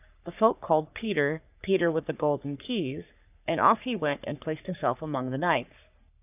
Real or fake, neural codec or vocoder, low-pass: fake; codec, 44.1 kHz, 3.4 kbps, Pupu-Codec; 3.6 kHz